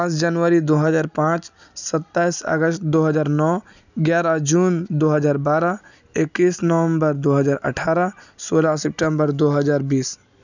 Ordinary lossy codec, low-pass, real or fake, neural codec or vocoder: none; 7.2 kHz; real; none